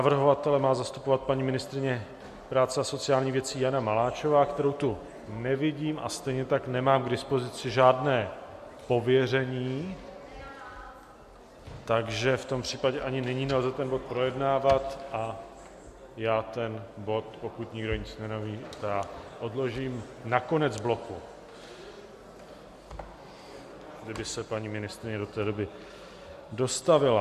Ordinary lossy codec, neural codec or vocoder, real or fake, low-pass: MP3, 64 kbps; none; real; 14.4 kHz